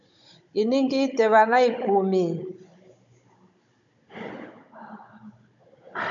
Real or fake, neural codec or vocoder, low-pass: fake; codec, 16 kHz, 16 kbps, FunCodec, trained on Chinese and English, 50 frames a second; 7.2 kHz